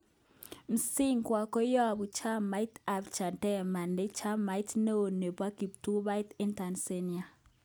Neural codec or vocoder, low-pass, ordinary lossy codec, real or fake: none; none; none; real